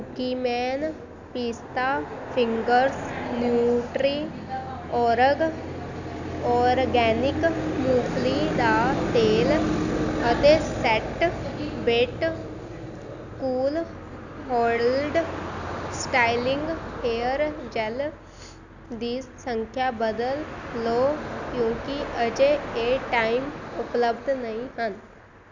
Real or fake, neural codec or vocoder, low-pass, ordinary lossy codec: real; none; 7.2 kHz; none